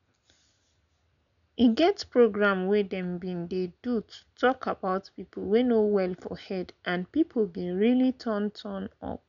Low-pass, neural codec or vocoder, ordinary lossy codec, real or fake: 7.2 kHz; none; none; real